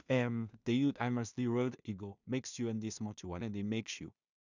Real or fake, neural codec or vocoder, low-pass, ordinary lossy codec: fake; codec, 16 kHz in and 24 kHz out, 0.4 kbps, LongCat-Audio-Codec, two codebook decoder; 7.2 kHz; none